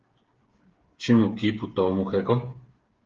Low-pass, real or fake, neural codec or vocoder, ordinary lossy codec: 7.2 kHz; fake; codec, 16 kHz, 8 kbps, FreqCodec, smaller model; Opus, 16 kbps